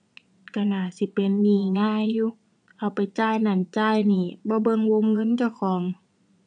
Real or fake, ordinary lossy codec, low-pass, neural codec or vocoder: fake; none; 9.9 kHz; vocoder, 22.05 kHz, 80 mel bands, Vocos